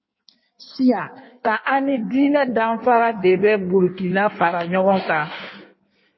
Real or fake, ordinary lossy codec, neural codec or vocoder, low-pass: fake; MP3, 24 kbps; codec, 16 kHz in and 24 kHz out, 1.1 kbps, FireRedTTS-2 codec; 7.2 kHz